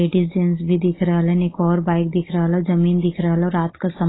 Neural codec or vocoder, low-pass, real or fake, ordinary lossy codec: none; 7.2 kHz; real; AAC, 16 kbps